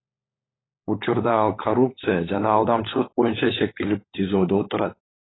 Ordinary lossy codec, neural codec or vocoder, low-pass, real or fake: AAC, 16 kbps; codec, 16 kHz, 16 kbps, FunCodec, trained on LibriTTS, 50 frames a second; 7.2 kHz; fake